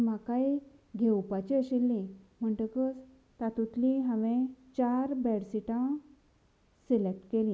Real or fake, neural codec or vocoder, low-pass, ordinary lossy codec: real; none; none; none